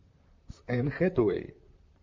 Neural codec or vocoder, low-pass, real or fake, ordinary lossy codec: vocoder, 44.1 kHz, 128 mel bands, Pupu-Vocoder; 7.2 kHz; fake; MP3, 48 kbps